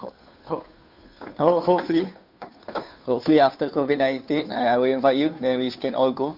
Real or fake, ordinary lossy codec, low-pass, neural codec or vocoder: fake; none; 5.4 kHz; codec, 16 kHz, 2 kbps, FunCodec, trained on Chinese and English, 25 frames a second